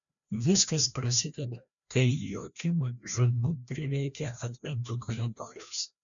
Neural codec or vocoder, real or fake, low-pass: codec, 16 kHz, 1 kbps, FreqCodec, larger model; fake; 7.2 kHz